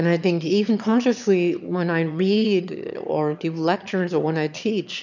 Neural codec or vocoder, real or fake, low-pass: autoencoder, 22.05 kHz, a latent of 192 numbers a frame, VITS, trained on one speaker; fake; 7.2 kHz